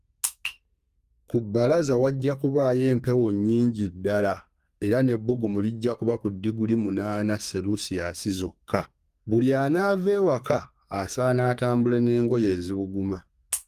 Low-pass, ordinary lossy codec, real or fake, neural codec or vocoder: 14.4 kHz; Opus, 32 kbps; fake; codec, 32 kHz, 1.9 kbps, SNAC